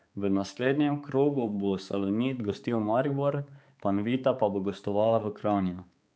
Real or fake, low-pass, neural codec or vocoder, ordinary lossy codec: fake; none; codec, 16 kHz, 4 kbps, X-Codec, HuBERT features, trained on balanced general audio; none